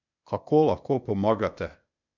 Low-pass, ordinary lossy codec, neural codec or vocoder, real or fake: 7.2 kHz; none; codec, 16 kHz, 0.8 kbps, ZipCodec; fake